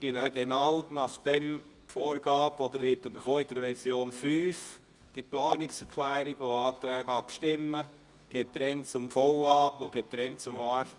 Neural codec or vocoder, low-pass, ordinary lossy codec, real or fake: codec, 24 kHz, 0.9 kbps, WavTokenizer, medium music audio release; 10.8 kHz; Opus, 64 kbps; fake